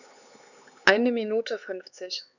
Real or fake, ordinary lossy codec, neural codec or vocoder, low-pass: fake; none; codec, 16 kHz, 4 kbps, X-Codec, HuBERT features, trained on LibriSpeech; 7.2 kHz